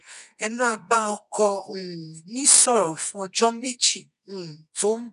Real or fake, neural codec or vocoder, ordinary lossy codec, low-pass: fake; codec, 24 kHz, 0.9 kbps, WavTokenizer, medium music audio release; none; 10.8 kHz